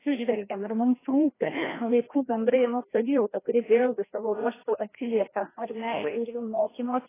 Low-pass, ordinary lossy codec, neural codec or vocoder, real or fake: 3.6 kHz; AAC, 16 kbps; codec, 16 kHz, 1 kbps, FreqCodec, larger model; fake